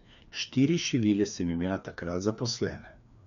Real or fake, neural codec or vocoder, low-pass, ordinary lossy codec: fake; codec, 16 kHz, 2 kbps, FreqCodec, larger model; 7.2 kHz; none